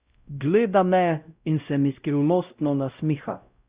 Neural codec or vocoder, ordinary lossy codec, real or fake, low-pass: codec, 16 kHz, 0.5 kbps, X-Codec, WavLM features, trained on Multilingual LibriSpeech; Opus, 64 kbps; fake; 3.6 kHz